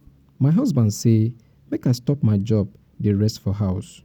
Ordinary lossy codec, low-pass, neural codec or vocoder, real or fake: none; 19.8 kHz; none; real